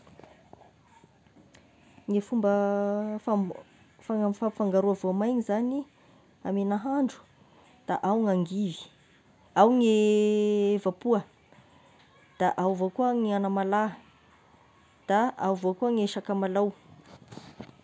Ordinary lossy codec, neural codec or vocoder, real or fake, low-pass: none; none; real; none